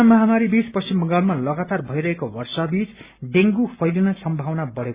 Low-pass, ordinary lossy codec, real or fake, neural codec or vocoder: 3.6 kHz; Opus, 64 kbps; real; none